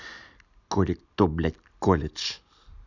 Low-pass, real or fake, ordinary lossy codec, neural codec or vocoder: 7.2 kHz; real; none; none